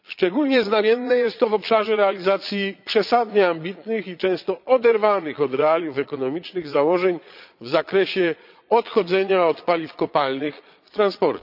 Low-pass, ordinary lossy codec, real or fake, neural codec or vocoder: 5.4 kHz; none; fake; vocoder, 22.05 kHz, 80 mel bands, Vocos